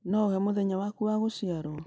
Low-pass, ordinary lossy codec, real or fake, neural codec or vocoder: none; none; real; none